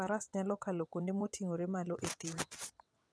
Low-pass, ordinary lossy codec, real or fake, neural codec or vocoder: none; none; fake; vocoder, 22.05 kHz, 80 mel bands, WaveNeXt